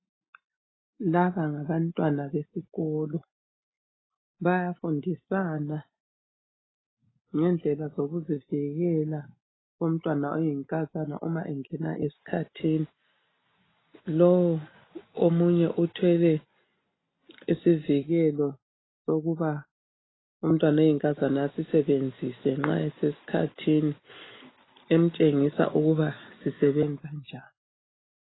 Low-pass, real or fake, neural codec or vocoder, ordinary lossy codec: 7.2 kHz; real; none; AAC, 16 kbps